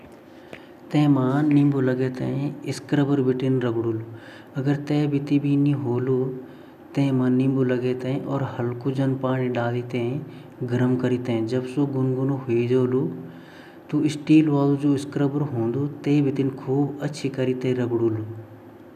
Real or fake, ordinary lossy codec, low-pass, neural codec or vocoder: real; none; 14.4 kHz; none